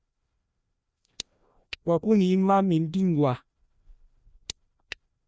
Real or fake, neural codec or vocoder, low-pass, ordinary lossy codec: fake; codec, 16 kHz, 1 kbps, FreqCodec, larger model; none; none